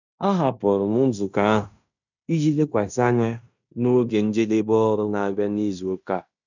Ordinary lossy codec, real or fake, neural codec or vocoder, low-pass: none; fake; codec, 16 kHz in and 24 kHz out, 0.9 kbps, LongCat-Audio-Codec, fine tuned four codebook decoder; 7.2 kHz